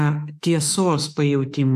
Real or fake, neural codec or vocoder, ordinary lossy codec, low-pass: fake; autoencoder, 48 kHz, 32 numbers a frame, DAC-VAE, trained on Japanese speech; AAC, 96 kbps; 14.4 kHz